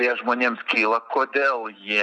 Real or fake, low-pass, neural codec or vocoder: real; 9.9 kHz; none